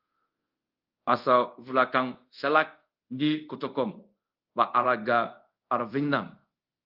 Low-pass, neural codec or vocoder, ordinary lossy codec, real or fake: 5.4 kHz; codec, 24 kHz, 0.5 kbps, DualCodec; Opus, 24 kbps; fake